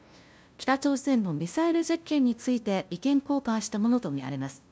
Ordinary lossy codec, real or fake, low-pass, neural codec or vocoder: none; fake; none; codec, 16 kHz, 0.5 kbps, FunCodec, trained on LibriTTS, 25 frames a second